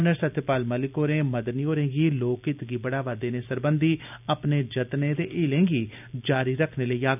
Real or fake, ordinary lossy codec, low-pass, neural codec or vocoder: real; none; 3.6 kHz; none